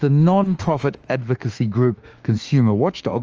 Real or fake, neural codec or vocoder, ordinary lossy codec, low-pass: fake; autoencoder, 48 kHz, 32 numbers a frame, DAC-VAE, trained on Japanese speech; Opus, 24 kbps; 7.2 kHz